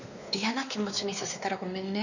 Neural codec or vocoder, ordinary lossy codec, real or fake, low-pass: codec, 16 kHz, 2 kbps, X-Codec, WavLM features, trained on Multilingual LibriSpeech; none; fake; 7.2 kHz